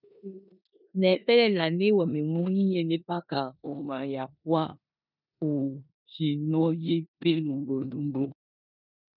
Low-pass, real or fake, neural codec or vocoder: 5.4 kHz; fake; codec, 16 kHz in and 24 kHz out, 0.9 kbps, LongCat-Audio-Codec, four codebook decoder